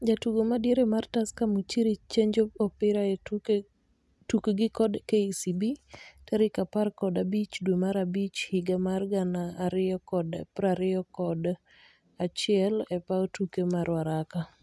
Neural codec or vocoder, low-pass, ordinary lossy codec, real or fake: none; none; none; real